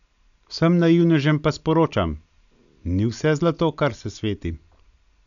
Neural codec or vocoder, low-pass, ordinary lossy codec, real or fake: none; 7.2 kHz; none; real